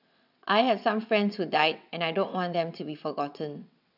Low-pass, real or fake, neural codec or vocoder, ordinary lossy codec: 5.4 kHz; fake; vocoder, 22.05 kHz, 80 mel bands, WaveNeXt; none